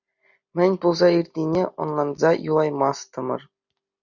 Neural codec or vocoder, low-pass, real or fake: none; 7.2 kHz; real